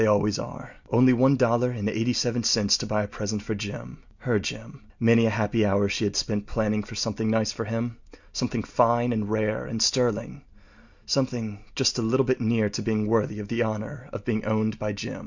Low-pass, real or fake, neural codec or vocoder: 7.2 kHz; real; none